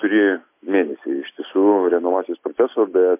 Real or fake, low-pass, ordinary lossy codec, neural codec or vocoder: real; 3.6 kHz; MP3, 24 kbps; none